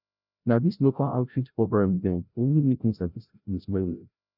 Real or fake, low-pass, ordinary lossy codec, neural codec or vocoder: fake; 5.4 kHz; none; codec, 16 kHz, 0.5 kbps, FreqCodec, larger model